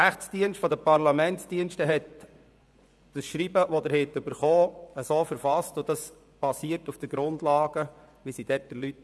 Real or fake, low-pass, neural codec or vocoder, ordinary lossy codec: fake; none; vocoder, 24 kHz, 100 mel bands, Vocos; none